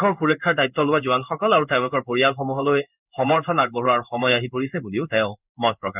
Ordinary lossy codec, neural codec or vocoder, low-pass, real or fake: none; codec, 16 kHz in and 24 kHz out, 1 kbps, XY-Tokenizer; 3.6 kHz; fake